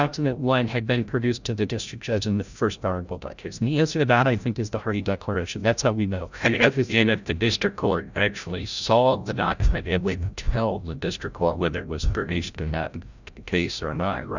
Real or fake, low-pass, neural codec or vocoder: fake; 7.2 kHz; codec, 16 kHz, 0.5 kbps, FreqCodec, larger model